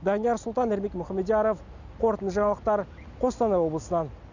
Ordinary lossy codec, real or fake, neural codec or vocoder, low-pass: none; real; none; 7.2 kHz